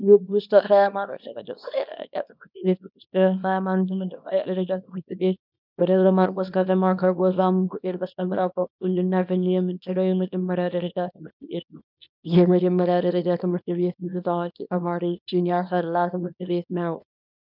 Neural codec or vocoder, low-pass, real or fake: codec, 24 kHz, 0.9 kbps, WavTokenizer, small release; 5.4 kHz; fake